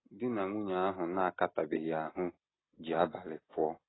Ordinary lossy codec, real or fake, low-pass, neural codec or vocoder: AAC, 16 kbps; real; 7.2 kHz; none